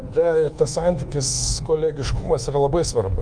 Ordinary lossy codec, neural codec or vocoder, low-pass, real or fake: Opus, 32 kbps; codec, 24 kHz, 1.2 kbps, DualCodec; 9.9 kHz; fake